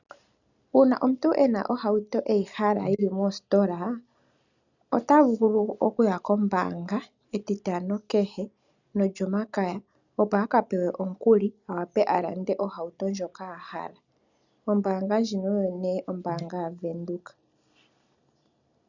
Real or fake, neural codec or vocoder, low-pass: real; none; 7.2 kHz